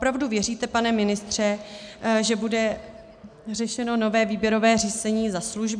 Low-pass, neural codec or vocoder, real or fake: 9.9 kHz; none; real